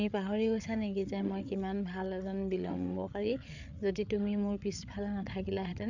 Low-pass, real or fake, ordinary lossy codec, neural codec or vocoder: 7.2 kHz; fake; none; codec, 16 kHz, 8 kbps, FreqCodec, larger model